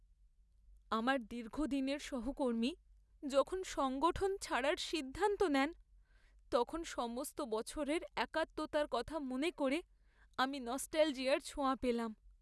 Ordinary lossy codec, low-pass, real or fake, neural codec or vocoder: none; none; real; none